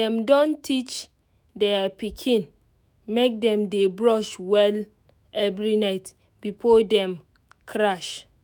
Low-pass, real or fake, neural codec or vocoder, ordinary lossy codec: none; fake; autoencoder, 48 kHz, 128 numbers a frame, DAC-VAE, trained on Japanese speech; none